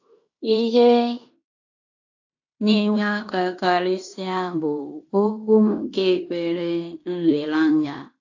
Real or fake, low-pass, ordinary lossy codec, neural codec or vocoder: fake; 7.2 kHz; AAC, 48 kbps; codec, 16 kHz in and 24 kHz out, 0.9 kbps, LongCat-Audio-Codec, fine tuned four codebook decoder